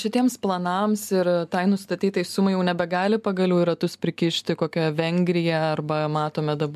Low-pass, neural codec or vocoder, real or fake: 14.4 kHz; none; real